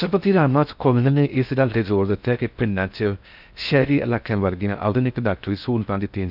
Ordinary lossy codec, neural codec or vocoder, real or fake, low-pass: none; codec, 16 kHz in and 24 kHz out, 0.6 kbps, FocalCodec, streaming, 4096 codes; fake; 5.4 kHz